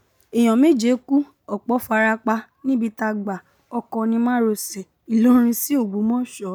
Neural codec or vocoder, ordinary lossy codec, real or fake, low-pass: none; none; real; none